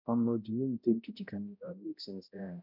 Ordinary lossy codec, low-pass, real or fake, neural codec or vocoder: none; 5.4 kHz; fake; codec, 16 kHz, 0.5 kbps, X-Codec, HuBERT features, trained on balanced general audio